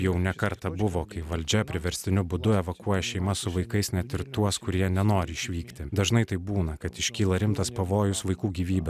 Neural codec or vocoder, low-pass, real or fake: none; 14.4 kHz; real